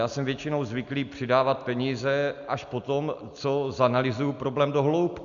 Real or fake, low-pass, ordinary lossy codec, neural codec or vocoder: real; 7.2 kHz; MP3, 96 kbps; none